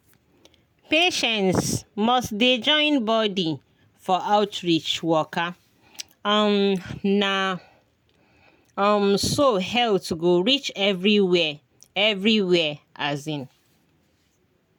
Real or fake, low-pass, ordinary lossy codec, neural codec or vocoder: real; 19.8 kHz; none; none